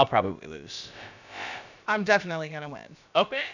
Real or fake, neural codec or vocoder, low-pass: fake; codec, 16 kHz, about 1 kbps, DyCAST, with the encoder's durations; 7.2 kHz